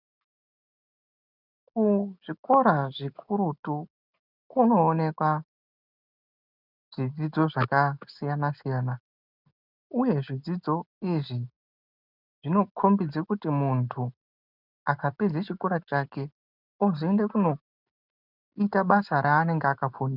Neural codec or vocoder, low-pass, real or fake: none; 5.4 kHz; real